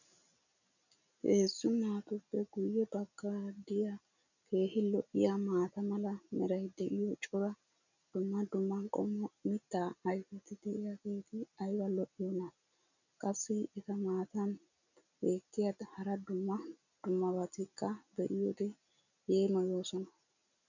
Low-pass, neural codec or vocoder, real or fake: 7.2 kHz; none; real